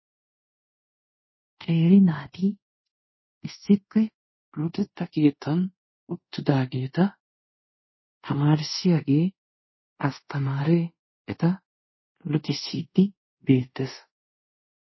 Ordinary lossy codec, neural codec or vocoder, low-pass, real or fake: MP3, 24 kbps; codec, 24 kHz, 0.5 kbps, DualCodec; 7.2 kHz; fake